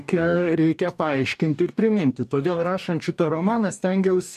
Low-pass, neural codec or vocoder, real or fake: 14.4 kHz; codec, 44.1 kHz, 2.6 kbps, DAC; fake